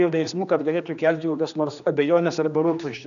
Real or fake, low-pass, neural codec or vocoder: fake; 7.2 kHz; codec, 16 kHz, 2 kbps, X-Codec, HuBERT features, trained on general audio